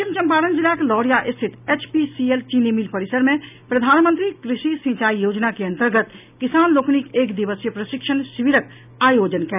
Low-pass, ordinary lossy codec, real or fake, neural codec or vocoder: 3.6 kHz; none; real; none